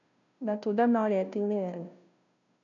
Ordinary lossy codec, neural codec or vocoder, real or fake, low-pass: none; codec, 16 kHz, 0.5 kbps, FunCodec, trained on Chinese and English, 25 frames a second; fake; 7.2 kHz